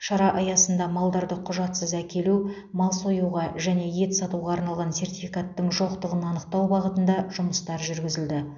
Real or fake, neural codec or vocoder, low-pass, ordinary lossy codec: real; none; 7.2 kHz; none